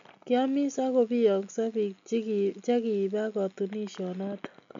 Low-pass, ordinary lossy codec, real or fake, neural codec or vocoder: 7.2 kHz; MP3, 48 kbps; real; none